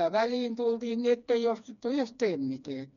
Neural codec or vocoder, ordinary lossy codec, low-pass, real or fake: codec, 16 kHz, 2 kbps, FreqCodec, smaller model; none; 7.2 kHz; fake